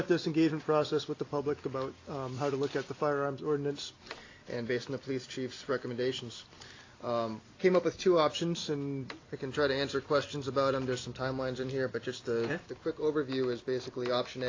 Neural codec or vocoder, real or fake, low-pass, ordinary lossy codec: none; real; 7.2 kHz; AAC, 32 kbps